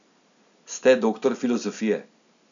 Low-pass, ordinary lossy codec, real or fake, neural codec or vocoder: 7.2 kHz; none; real; none